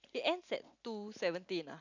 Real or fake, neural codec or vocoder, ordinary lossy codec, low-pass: real; none; none; 7.2 kHz